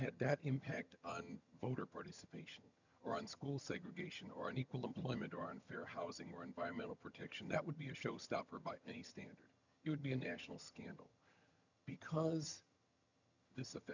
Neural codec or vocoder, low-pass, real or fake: vocoder, 22.05 kHz, 80 mel bands, HiFi-GAN; 7.2 kHz; fake